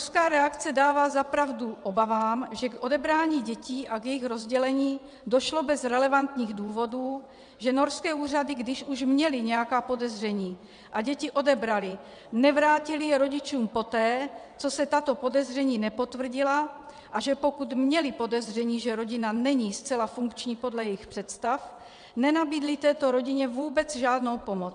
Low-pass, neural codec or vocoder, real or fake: 9.9 kHz; vocoder, 22.05 kHz, 80 mel bands, WaveNeXt; fake